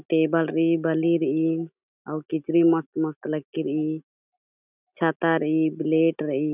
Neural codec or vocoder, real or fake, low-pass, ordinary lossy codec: none; real; 3.6 kHz; none